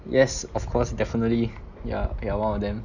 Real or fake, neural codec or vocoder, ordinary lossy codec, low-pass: real; none; none; 7.2 kHz